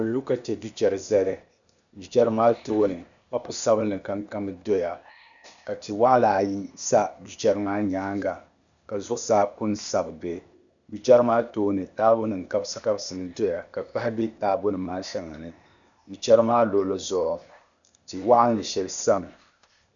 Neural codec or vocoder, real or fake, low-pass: codec, 16 kHz, 0.8 kbps, ZipCodec; fake; 7.2 kHz